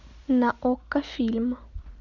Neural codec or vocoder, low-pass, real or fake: none; 7.2 kHz; real